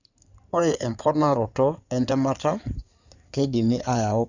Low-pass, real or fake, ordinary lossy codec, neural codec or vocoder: 7.2 kHz; fake; none; codec, 16 kHz in and 24 kHz out, 2.2 kbps, FireRedTTS-2 codec